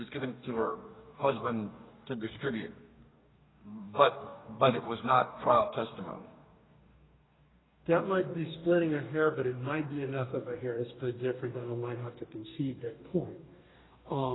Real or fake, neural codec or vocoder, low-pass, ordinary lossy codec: fake; codec, 44.1 kHz, 2.6 kbps, DAC; 7.2 kHz; AAC, 16 kbps